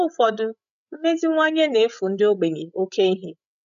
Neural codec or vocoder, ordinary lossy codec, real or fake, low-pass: codec, 16 kHz, 16 kbps, FreqCodec, larger model; none; fake; 7.2 kHz